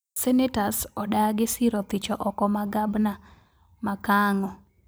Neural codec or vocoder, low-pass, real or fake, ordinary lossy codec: vocoder, 44.1 kHz, 128 mel bands every 256 samples, BigVGAN v2; none; fake; none